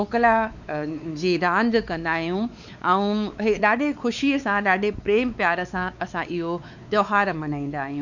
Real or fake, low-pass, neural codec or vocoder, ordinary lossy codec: fake; 7.2 kHz; codec, 16 kHz, 4 kbps, X-Codec, WavLM features, trained on Multilingual LibriSpeech; none